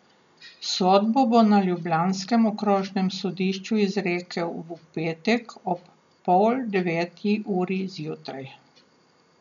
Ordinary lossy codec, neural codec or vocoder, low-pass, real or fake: none; none; 7.2 kHz; real